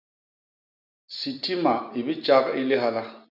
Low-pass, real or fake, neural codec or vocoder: 5.4 kHz; real; none